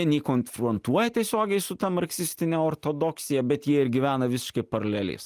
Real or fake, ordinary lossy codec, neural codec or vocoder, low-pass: real; Opus, 32 kbps; none; 14.4 kHz